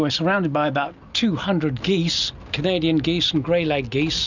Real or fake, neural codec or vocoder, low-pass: real; none; 7.2 kHz